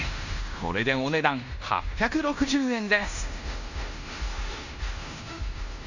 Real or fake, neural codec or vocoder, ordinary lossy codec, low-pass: fake; codec, 16 kHz in and 24 kHz out, 0.9 kbps, LongCat-Audio-Codec, fine tuned four codebook decoder; none; 7.2 kHz